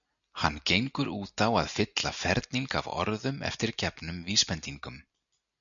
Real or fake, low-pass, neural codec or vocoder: real; 7.2 kHz; none